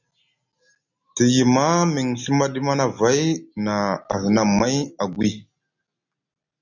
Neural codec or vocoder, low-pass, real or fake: none; 7.2 kHz; real